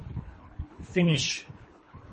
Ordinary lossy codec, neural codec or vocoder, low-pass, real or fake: MP3, 32 kbps; codec, 24 kHz, 3 kbps, HILCodec; 10.8 kHz; fake